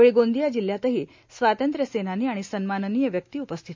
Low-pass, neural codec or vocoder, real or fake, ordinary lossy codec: 7.2 kHz; none; real; MP3, 48 kbps